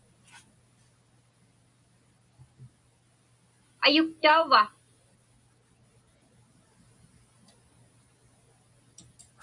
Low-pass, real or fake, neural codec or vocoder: 10.8 kHz; real; none